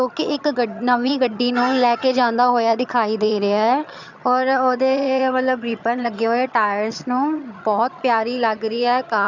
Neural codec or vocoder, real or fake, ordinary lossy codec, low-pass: vocoder, 22.05 kHz, 80 mel bands, HiFi-GAN; fake; none; 7.2 kHz